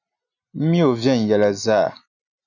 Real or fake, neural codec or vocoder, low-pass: real; none; 7.2 kHz